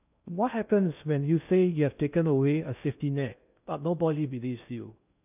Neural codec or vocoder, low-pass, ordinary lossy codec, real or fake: codec, 16 kHz in and 24 kHz out, 0.6 kbps, FocalCodec, streaming, 2048 codes; 3.6 kHz; none; fake